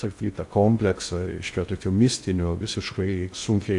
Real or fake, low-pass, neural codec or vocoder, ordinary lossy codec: fake; 10.8 kHz; codec, 16 kHz in and 24 kHz out, 0.6 kbps, FocalCodec, streaming, 2048 codes; AAC, 64 kbps